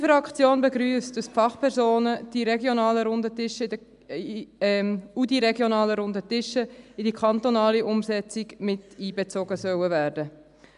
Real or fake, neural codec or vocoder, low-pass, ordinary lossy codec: real; none; 10.8 kHz; AAC, 96 kbps